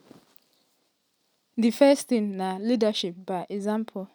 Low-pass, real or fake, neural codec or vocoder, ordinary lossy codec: none; real; none; none